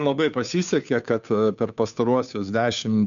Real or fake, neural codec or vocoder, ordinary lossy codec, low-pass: fake; codec, 16 kHz, 2 kbps, FunCodec, trained on Chinese and English, 25 frames a second; MP3, 96 kbps; 7.2 kHz